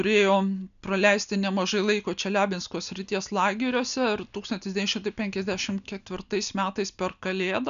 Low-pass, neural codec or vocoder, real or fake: 7.2 kHz; none; real